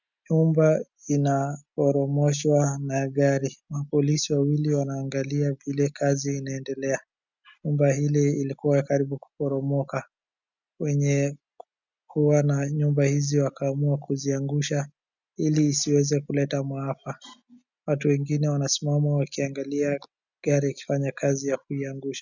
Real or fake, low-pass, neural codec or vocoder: real; 7.2 kHz; none